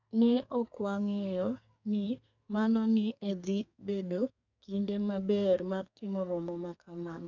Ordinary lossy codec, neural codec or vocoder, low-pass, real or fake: none; codec, 32 kHz, 1.9 kbps, SNAC; 7.2 kHz; fake